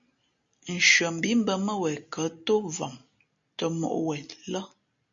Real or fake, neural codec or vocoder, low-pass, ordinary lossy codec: real; none; 7.2 kHz; MP3, 64 kbps